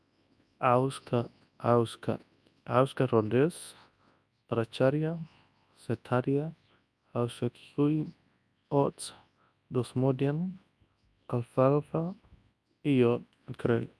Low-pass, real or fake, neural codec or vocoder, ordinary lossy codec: none; fake; codec, 24 kHz, 0.9 kbps, WavTokenizer, large speech release; none